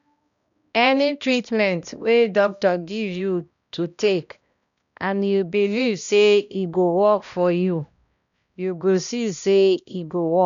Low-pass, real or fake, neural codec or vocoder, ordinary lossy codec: 7.2 kHz; fake; codec, 16 kHz, 1 kbps, X-Codec, HuBERT features, trained on balanced general audio; none